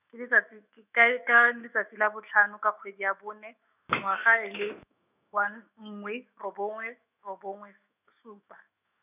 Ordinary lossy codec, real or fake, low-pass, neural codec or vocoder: none; fake; 3.6 kHz; autoencoder, 48 kHz, 128 numbers a frame, DAC-VAE, trained on Japanese speech